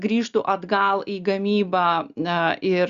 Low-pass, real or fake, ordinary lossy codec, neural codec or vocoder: 7.2 kHz; real; Opus, 64 kbps; none